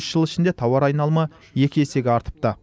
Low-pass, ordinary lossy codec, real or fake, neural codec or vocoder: none; none; real; none